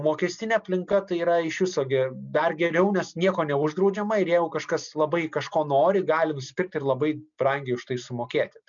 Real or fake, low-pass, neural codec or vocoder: real; 7.2 kHz; none